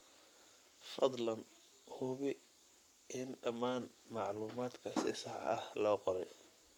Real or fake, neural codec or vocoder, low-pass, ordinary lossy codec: fake; codec, 44.1 kHz, 7.8 kbps, Pupu-Codec; 19.8 kHz; MP3, 96 kbps